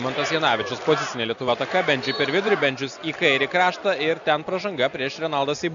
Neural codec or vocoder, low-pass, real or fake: none; 7.2 kHz; real